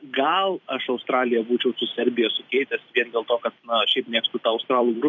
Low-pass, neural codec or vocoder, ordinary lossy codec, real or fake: 7.2 kHz; vocoder, 44.1 kHz, 128 mel bands every 256 samples, BigVGAN v2; MP3, 48 kbps; fake